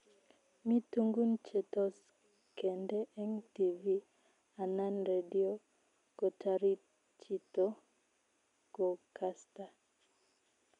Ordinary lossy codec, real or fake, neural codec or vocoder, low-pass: none; real; none; 10.8 kHz